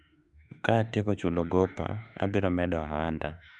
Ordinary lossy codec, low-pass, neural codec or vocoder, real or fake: none; 10.8 kHz; autoencoder, 48 kHz, 32 numbers a frame, DAC-VAE, trained on Japanese speech; fake